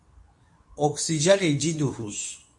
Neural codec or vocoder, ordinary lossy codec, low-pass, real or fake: codec, 24 kHz, 0.9 kbps, WavTokenizer, medium speech release version 2; MP3, 48 kbps; 10.8 kHz; fake